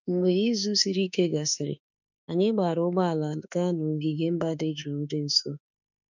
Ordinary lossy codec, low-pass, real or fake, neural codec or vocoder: none; 7.2 kHz; fake; autoencoder, 48 kHz, 32 numbers a frame, DAC-VAE, trained on Japanese speech